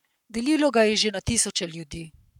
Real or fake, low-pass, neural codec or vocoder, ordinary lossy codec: fake; 19.8 kHz; codec, 44.1 kHz, 7.8 kbps, Pupu-Codec; none